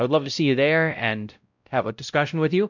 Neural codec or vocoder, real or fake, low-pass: codec, 16 kHz, 0.5 kbps, X-Codec, WavLM features, trained on Multilingual LibriSpeech; fake; 7.2 kHz